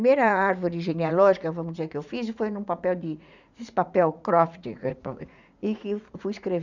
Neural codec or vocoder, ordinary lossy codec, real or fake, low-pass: vocoder, 44.1 kHz, 80 mel bands, Vocos; none; fake; 7.2 kHz